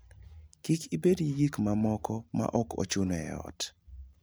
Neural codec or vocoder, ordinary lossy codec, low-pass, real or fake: none; none; none; real